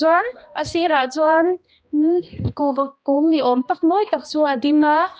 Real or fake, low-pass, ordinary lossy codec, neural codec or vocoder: fake; none; none; codec, 16 kHz, 1 kbps, X-Codec, HuBERT features, trained on balanced general audio